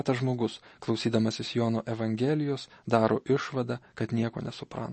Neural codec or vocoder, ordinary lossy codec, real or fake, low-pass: none; MP3, 32 kbps; real; 10.8 kHz